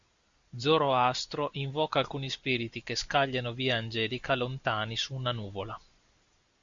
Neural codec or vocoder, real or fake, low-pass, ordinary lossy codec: none; real; 7.2 kHz; Opus, 64 kbps